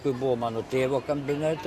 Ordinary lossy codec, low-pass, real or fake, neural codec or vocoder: MP3, 64 kbps; 14.4 kHz; real; none